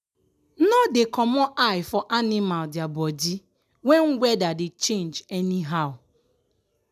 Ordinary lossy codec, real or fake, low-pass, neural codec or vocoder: none; real; 14.4 kHz; none